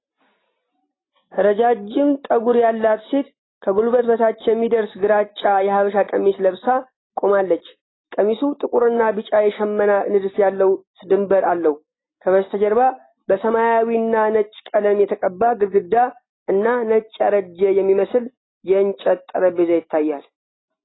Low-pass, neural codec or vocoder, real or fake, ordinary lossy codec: 7.2 kHz; none; real; AAC, 16 kbps